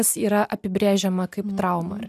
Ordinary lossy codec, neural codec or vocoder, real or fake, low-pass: AAC, 96 kbps; none; real; 14.4 kHz